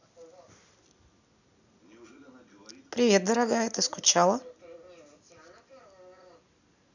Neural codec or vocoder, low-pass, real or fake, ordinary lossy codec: none; 7.2 kHz; real; none